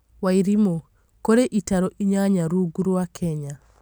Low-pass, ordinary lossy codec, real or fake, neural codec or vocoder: none; none; real; none